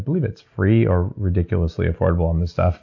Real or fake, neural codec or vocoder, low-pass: real; none; 7.2 kHz